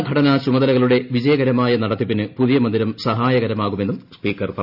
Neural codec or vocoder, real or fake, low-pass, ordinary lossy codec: none; real; 5.4 kHz; none